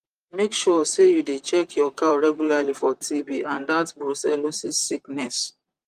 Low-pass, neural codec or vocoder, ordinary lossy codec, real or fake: 14.4 kHz; vocoder, 44.1 kHz, 128 mel bands, Pupu-Vocoder; Opus, 32 kbps; fake